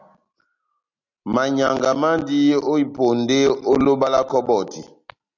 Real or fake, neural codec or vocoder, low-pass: real; none; 7.2 kHz